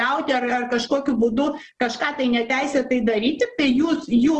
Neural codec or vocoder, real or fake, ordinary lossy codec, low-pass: none; real; Opus, 16 kbps; 10.8 kHz